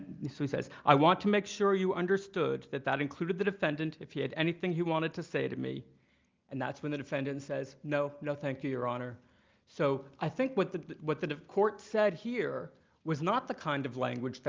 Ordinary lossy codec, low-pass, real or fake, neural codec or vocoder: Opus, 32 kbps; 7.2 kHz; real; none